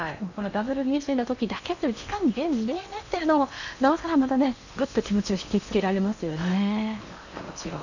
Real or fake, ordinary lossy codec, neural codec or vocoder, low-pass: fake; none; codec, 16 kHz in and 24 kHz out, 0.8 kbps, FocalCodec, streaming, 65536 codes; 7.2 kHz